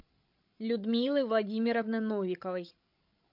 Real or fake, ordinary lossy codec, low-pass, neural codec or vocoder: fake; MP3, 48 kbps; 5.4 kHz; codec, 16 kHz, 16 kbps, FreqCodec, larger model